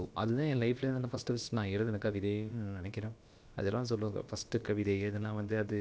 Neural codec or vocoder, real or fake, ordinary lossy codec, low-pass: codec, 16 kHz, about 1 kbps, DyCAST, with the encoder's durations; fake; none; none